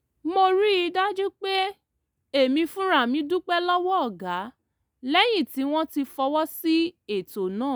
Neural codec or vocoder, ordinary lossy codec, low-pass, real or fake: none; none; none; real